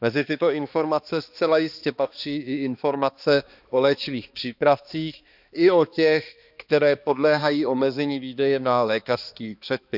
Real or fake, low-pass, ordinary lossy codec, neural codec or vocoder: fake; 5.4 kHz; none; codec, 16 kHz, 2 kbps, X-Codec, HuBERT features, trained on balanced general audio